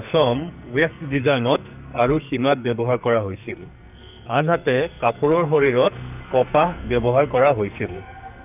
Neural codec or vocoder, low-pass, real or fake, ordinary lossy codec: codec, 44.1 kHz, 2.6 kbps, SNAC; 3.6 kHz; fake; none